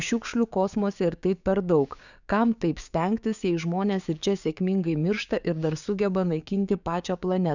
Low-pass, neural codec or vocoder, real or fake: 7.2 kHz; codec, 16 kHz, 6 kbps, DAC; fake